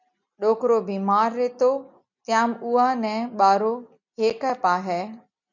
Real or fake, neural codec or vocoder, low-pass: real; none; 7.2 kHz